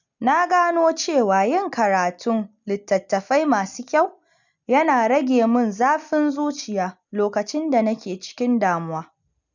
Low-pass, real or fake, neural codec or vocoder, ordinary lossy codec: 7.2 kHz; real; none; none